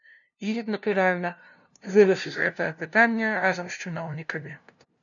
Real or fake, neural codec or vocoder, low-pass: fake; codec, 16 kHz, 0.5 kbps, FunCodec, trained on LibriTTS, 25 frames a second; 7.2 kHz